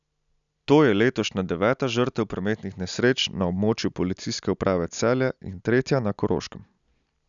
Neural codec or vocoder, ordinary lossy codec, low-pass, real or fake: none; none; 7.2 kHz; real